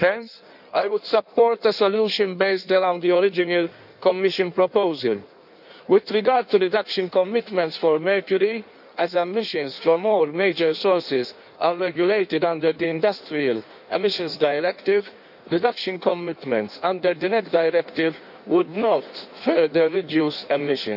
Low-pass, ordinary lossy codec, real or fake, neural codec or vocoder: 5.4 kHz; none; fake; codec, 16 kHz in and 24 kHz out, 1.1 kbps, FireRedTTS-2 codec